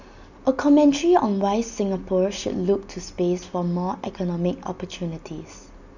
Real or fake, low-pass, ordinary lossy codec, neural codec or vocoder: real; 7.2 kHz; none; none